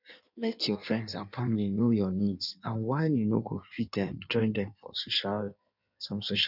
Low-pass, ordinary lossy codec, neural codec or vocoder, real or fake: 5.4 kHz; none; codec, 16 kHz in and 24 kHz out, 1.1 kbps, FireRedTTS-2 codec; fake